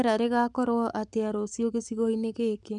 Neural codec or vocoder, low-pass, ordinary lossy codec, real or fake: codec, 44.1 kHz, 7.8 kbps, Pupu-Codec; 10.8 kHz; none; fake